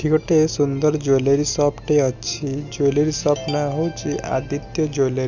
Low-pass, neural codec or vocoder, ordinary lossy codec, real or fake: 7.2 kHz; none; none; real